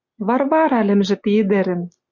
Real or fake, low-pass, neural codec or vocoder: real; 7.2 kHz; none